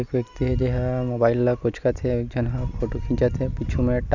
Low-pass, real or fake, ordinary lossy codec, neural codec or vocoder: 7.2 kHz; real; none; none